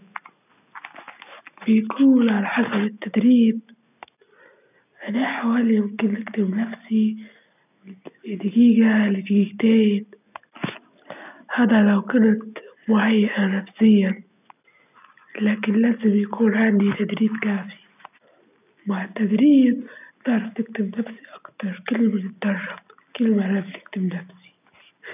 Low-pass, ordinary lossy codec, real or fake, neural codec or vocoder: 3.6 kHz; none; real; none